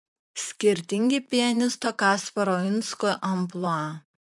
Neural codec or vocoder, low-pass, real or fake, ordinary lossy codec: vocoder, 44.1 kHz, 128 mel bands, Pupu-Vocoder; 10.8 kHz; fake; MP3, 64 kbps